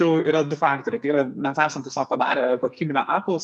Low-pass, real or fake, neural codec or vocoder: 10.8 kHz; fake; codec, 44.1 kHz, 2.6 kbps, SNAC